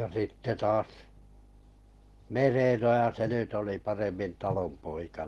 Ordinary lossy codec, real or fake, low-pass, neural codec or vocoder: Opus, 16 kbps; real; 19.8 kHz; none